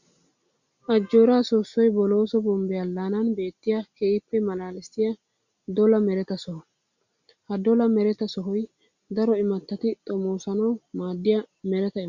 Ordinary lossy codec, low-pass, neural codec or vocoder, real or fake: Opus, 64 kbps; 7.2 kHz; none; real